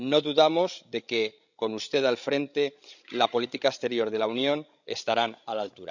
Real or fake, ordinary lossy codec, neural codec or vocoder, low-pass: fake; none; codec, 16 kHz, 16 kbps, FreqCodec, larger model; 7.2 kHz